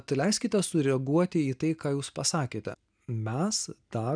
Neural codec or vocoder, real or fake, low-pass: none; real; 9.9 kHz